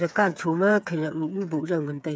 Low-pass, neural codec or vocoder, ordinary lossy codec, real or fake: none; codec, 16 kHz, 8 kbps, FreqCodec, smaller model; none; fake